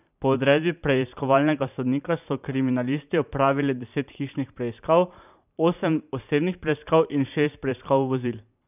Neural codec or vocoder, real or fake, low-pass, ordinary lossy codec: vocoder, 44.1 kHz, 128 mel bands every 512 samples, BigVGAN v2; fake; 3.6 kHz; none